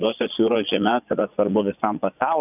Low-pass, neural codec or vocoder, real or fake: 3.6 kHz; vocoder, 22.05 kHz, 80 mel bands, Vocos; fake